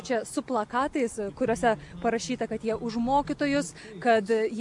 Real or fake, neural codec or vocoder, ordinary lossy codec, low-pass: real; none; MP3, 64 kbps; 10.8 kHz